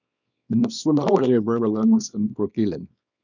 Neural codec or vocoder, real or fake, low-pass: codec, 24 kHz, 0.9 kbps, WavTokenizer, small release; fake; 7.2 kHz